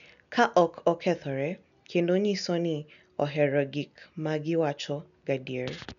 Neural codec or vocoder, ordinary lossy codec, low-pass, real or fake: none; none; 7.2 kHz; real